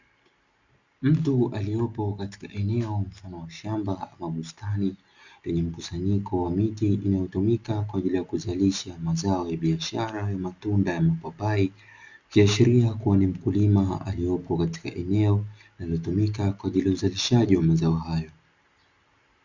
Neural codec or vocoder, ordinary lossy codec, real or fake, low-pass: none; Opus, 64 kbps; real; 7.2 kHz